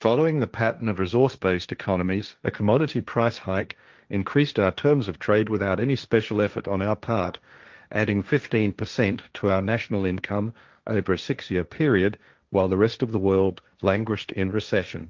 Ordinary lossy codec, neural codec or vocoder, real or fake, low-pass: Opus, 24 kbps; codec, 16 kHz, 1.1 kbps, Voila-Tokenizer; fake; 7.2 kHz